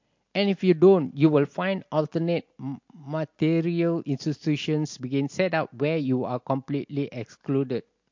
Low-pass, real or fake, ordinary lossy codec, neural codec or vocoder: 7.2 kHz; real; MP3, 48 kbps; none